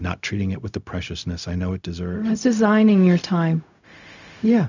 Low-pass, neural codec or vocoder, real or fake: 7.2 kHz; codec, 16 kHz, 0.4 kbps, LongCat-Audio-Codec; fake